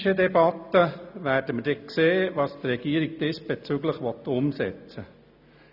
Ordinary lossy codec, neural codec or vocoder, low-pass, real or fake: none; none; 5.4 kHz; real